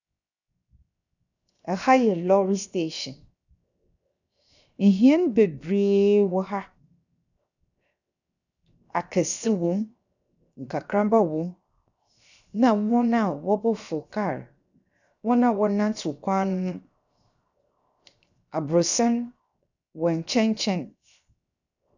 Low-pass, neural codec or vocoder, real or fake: 7.2 kHz; codec, 16 kHz, 0.7 kbps, FocalCodec; fake